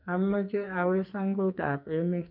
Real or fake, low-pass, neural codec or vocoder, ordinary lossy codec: fake; 5.4 kHz; codec, 44.1 kHz, 2.6 kbps, SNAC; none